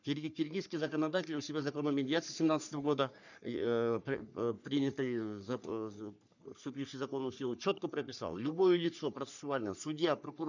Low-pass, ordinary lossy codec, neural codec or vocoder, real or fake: 7.2 kHz; none; codec, 44.1 kHz, 3.4 kbps, Pupu-Codec; fake